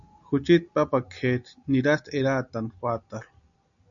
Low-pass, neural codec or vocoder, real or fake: 7.2 kHz; none; real